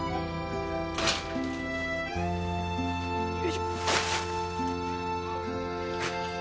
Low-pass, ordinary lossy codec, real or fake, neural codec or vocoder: none; none; real; none